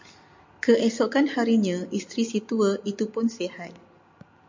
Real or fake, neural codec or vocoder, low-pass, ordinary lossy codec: real; none; 7.2 kHz; MP3, 48 kbps